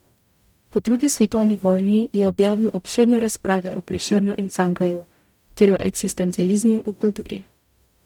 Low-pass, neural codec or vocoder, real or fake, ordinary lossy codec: 19.8 kHz; codec, 44.1 kHz, 0.9 kbps, DAC; fake; none